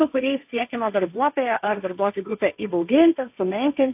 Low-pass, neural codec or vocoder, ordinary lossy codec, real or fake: 3.6 kHz; codec, 16 kHz, 1.1 kbps, Voila-Tokenizer; AAC, 32 kbps; fake